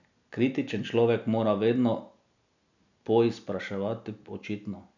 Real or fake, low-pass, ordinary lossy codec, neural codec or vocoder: real; 7.2 kHz; none; none